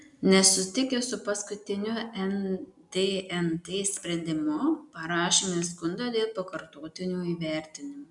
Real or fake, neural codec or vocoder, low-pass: real; none; 10.8 kHz